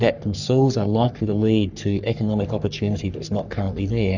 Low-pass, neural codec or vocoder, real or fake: 7.2 kHz; codec, 44.1 kHz, 3.4 kbps, Pupu-Codec; fake